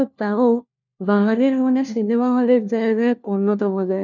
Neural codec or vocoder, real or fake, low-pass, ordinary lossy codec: codec, 16 kHz, 1 kbps, FunCodec, trained on LibriTTS, 50 frames a second; fake; 7.2 kHz; none